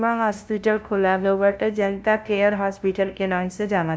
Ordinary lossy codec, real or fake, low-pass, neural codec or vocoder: none; fake; none; codec, 16 kHz, 0.5 kbps, FunCodec, trained on LibriTTS, 25 frames a second